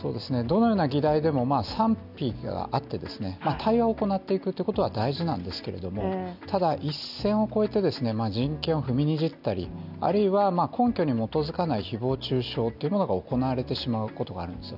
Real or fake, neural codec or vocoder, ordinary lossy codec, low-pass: real; none; none; 5.4 kHz